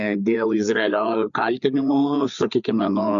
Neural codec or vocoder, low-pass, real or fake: codec, 16 kHz, 4 kbps, FreqCodec, larger model; 7.2 kHz; fake